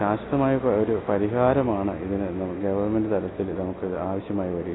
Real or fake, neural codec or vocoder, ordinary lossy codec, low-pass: real; none; AAC, 16 kbps; 7.2 kHz